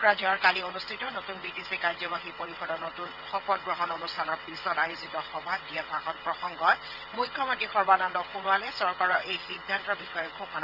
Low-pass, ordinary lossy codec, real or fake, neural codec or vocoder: 5.4 kHz; none; fake; vocoder, 22.05 kHz, 80 mel bands, WaveNeXt